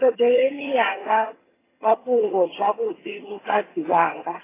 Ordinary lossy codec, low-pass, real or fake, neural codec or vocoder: AAC, 16 kbps; 3.6 kHz; fake; vocoder, 22.05 kHz, 80 mel bands, HiFi-GAN